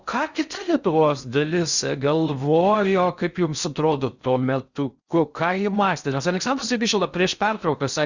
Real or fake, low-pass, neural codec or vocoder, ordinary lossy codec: fake; 7.2 kHz; codec, 16 kHz in and 24 kHz out, 0.6 kbps, FocalCodec, streaming, 4096 codes; Opus, 64 kbps